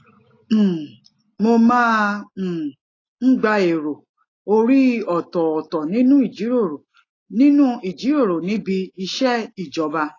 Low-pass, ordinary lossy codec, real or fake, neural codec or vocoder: 7.2 kHz; AAC, 32 kbps; real; none